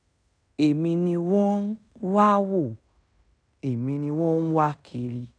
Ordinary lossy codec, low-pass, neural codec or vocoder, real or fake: none; 9.9 kHz; codec, 16 kHz in and 24 kHz out, 0.9 kbps, LongCat-Audio-Codec, fine tuned four codebook decoder; fake